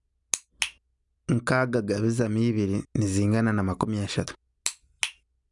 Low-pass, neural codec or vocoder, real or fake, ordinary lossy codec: 10.8 kHz; none; real; none